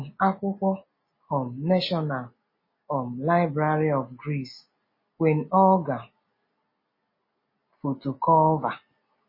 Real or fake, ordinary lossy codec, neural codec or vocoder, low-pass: real; MP3, 24 kbps; none; 5.4 kHz